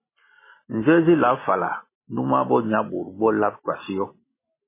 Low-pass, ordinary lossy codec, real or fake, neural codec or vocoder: 3.6 kHz; MP3, 16 kbps; real; none